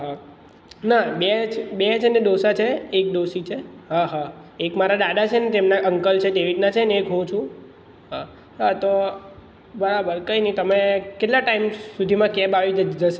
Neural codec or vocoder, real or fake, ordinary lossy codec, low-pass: none; real; none; none